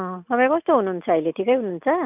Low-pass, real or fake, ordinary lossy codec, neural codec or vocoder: 3.6 kHz; real; none; none